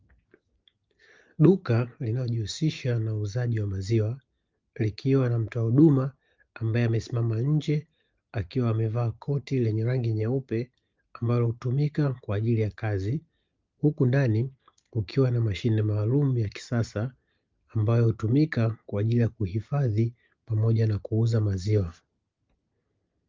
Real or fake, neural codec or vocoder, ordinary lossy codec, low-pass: real; none; Opus, 24 kbps; 7.2 kHz